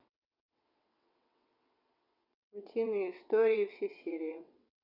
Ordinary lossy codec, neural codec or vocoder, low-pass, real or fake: none; vocoder, 22.05 kHz, 80 mel bands, WaveNeXt; 5.4 kHz; fake